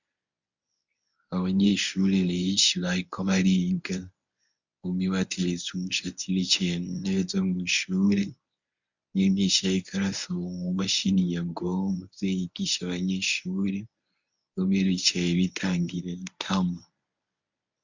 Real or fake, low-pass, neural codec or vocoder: fake; 7.2 kHz; codec, 24 kHz, 0.9 kbps, WavTokenizer, medium speech release version 1